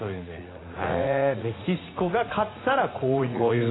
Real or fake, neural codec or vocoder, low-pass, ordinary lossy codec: fake; vocoder, 44.1 kHz, 80 mel bands, Vocos; 7.2 kHz; AAC, 16 kbps